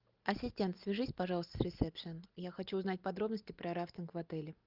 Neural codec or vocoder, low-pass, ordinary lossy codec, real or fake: none; 5.4 kHz; Opus, 24 kbps; real